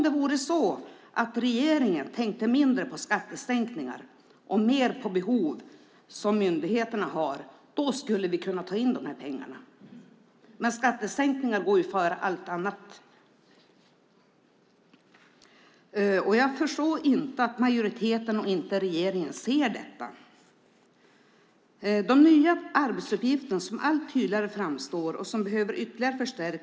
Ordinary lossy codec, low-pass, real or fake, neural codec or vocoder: none; none; real; none